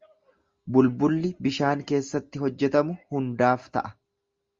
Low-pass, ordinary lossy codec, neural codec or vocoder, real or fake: 7.2 kHz; Opus, 32 kbps; none; real